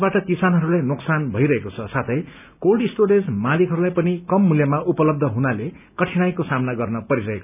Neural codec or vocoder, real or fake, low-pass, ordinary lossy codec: none; real; 3.6 kHz; none